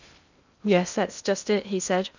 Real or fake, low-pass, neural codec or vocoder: fake; 7.2 kHz; codec, 16 kHz in and 24 kHz out, 0.6 kbps, FocalCodec, streaming, 2048 codes